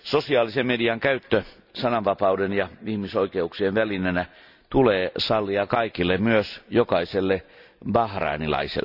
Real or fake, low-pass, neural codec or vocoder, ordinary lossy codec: real; 5.4 kHz; none; none